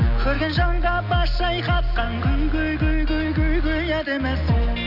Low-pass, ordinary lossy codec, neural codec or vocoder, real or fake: 5.4 kHz; none; none; real